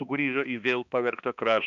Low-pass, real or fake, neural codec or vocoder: 7.2 kHz; fake; codec, 16 kHz, 2 kbps, X-Codec, HuBERT features, trained on balanced general audio